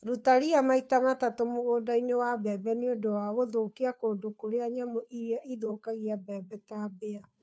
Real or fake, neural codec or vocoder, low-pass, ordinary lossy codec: fake; codec, 16 kHz, 2 kbps, FunCodec, trained on Chinese and English, 25 frames a second; none; none